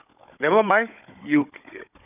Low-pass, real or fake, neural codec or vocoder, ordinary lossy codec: 3.6 kHz; fake; codec, 16 kHz, 16 kbps, FunCodec, trained on Chinese and English, 50 frames a second; none